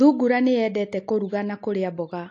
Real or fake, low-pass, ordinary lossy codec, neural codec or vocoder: real; 7.2 kHz; AAC, 48 kbps; none